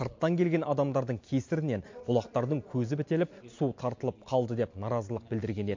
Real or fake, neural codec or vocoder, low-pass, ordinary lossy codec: real; none; 7.2 kHz; MP3, 48 kbps